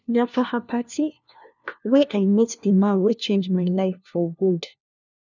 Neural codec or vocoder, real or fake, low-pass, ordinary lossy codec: codec, 16 kHz, 1 kbps, FunCodec, trained on LibriTTS, 50 frames a second; fake; 7.2 kHz; none